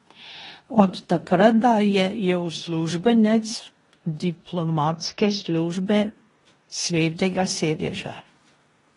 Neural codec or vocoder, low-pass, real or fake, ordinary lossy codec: codec, 16 kHz in and 24 kHz out, 0.9 kbps, LongCat-Audio-Codec, four codebook decoder; 10.8 kHz; fake; AAC, 32 kbps